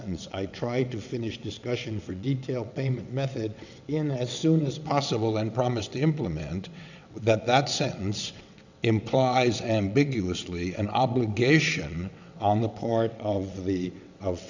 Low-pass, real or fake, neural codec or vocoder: 7.2 kHz; fake; vocoder, 22.05 kHz, 80 mel bands, WaveNeXt